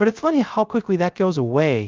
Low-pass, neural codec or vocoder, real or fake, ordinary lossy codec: 7.2 kHz; codec, 16 kHz, 0.3 kbps, FocalCodec; fake; Opus, 24 kbps